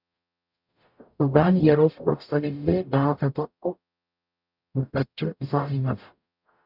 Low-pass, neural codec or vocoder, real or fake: 5.4 kHz; codec, 44.1 kHz, 0.9 kbps, DAC; fake